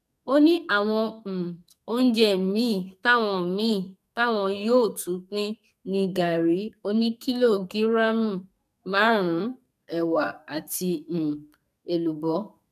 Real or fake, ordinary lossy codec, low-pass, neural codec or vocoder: fake; none; 14.4 kHz; codec, 44.1 kHz, 2.6 kbps, SNAC